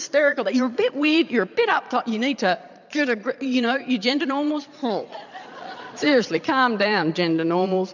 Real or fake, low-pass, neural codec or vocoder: fake; 7.2 kHz; vocoder, 22.05 kHz, 80 mel bands, Vocos